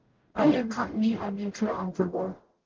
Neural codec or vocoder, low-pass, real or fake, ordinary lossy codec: codec, 44.1 kHz, 0.9 kbps, DAC; 7.2 kHz; fake; Opus, 16 kbps